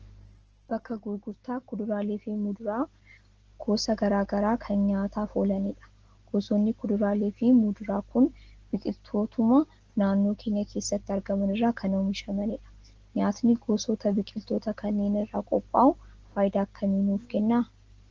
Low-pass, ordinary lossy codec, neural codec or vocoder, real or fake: 7.2 kHz; Opus, 16 kbps; none; real